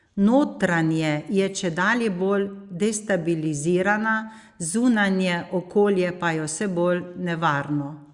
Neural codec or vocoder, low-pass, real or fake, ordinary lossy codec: none; 10.8 kHz; real; Opus, 64 kbps